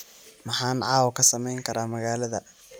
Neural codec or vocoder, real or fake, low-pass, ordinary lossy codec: none; real; none; none